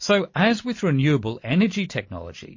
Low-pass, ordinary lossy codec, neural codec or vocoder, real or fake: 7.2 kHz; MP3, 32 kbps; none; real